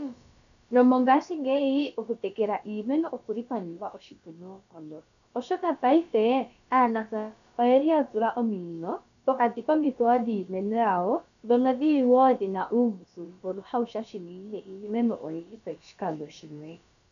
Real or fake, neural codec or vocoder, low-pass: fake; codec, 16 kHz, about 1 kbps, DyCAST, with the encoder's durations; 7.2 kHz